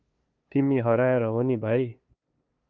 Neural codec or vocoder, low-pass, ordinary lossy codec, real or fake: codec, 16 kHz, 2 kbps, FunCodec, trained on LibriTTS, 25 frames a second; 7.2 kHz; Opus, 24 kbps; fake